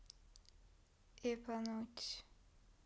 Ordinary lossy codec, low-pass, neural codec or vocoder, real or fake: none; none; none; real